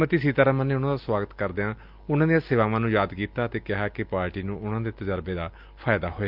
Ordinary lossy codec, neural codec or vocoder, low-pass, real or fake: Opus, 24 kbps; none; 5.4 kHz; real